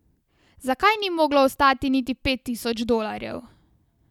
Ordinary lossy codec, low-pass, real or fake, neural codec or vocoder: none; 19.8 kHz; real; none